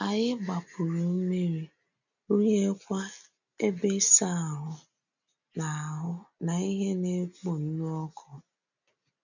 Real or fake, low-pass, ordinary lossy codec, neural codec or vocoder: real; 7.2 kHz; none; none